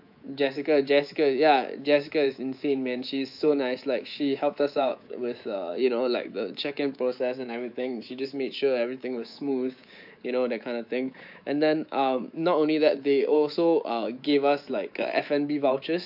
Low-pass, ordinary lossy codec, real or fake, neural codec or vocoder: 5.4 kHz; AAC, 48 kbps; fake; codec, 24 kHz, 3.1 kbps, DualCodec